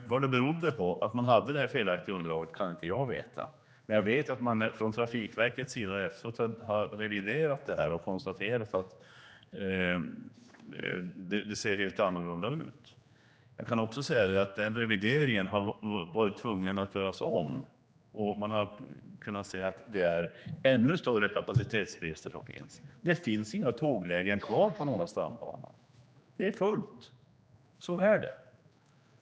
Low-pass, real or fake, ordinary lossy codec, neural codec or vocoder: none; fake; none; codec, 16 kHz, 2 kbps, X-Codec, HuBERT features, trained on general audio